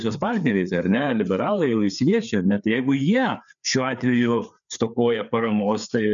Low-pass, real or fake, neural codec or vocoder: 7.2 kHz; fake; codec, 16 kHz, 4 kbps, FreqCodec, larger model